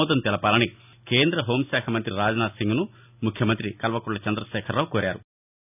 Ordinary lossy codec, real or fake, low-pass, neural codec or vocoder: none; real; 3.6 kHz; none